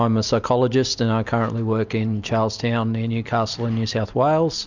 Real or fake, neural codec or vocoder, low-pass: real; none; 7.2 kHz